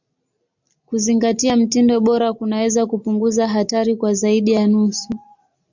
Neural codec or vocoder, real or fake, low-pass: none; real; 7.2 kHz